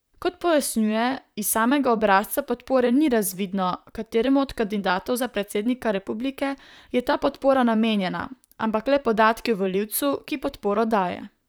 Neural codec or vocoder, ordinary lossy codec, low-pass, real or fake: vocoder, 44.1 kHz, 128 mel bands, Pupu-Vocoder; none; none; fake